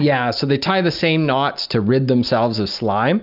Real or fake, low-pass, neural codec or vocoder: real; 5.4 kHz; none